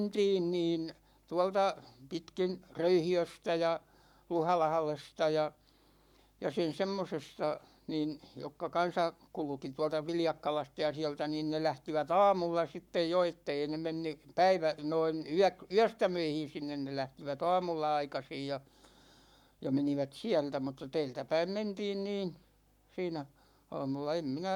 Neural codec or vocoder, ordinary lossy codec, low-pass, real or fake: codec, 44.1 kHz, 7.8 kbps, Pupu-Codec; none; 19.8 kHz; fake